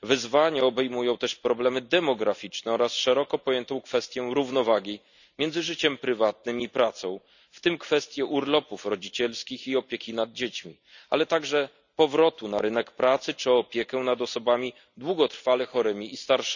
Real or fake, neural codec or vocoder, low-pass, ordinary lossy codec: real; none; 7.2 kHz; none